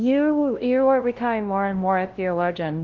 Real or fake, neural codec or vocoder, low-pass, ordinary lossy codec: fake; codec, 16 kHz, 0.5 kbps, FunCodec, trained on LibriTTS, 25 frames a second; 7.2 kHz; Opus, 16 kbps